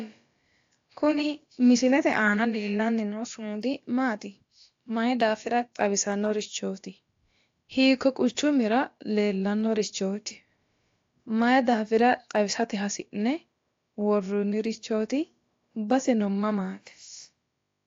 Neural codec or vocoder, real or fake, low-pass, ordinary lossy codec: codec, 16 kHz, about 1 kbps, DyCAST, with the encoder's durations; fake; 7.2 kHz; AAC, 48 kbps